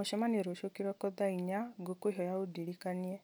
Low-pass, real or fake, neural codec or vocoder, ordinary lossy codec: none; real; none; none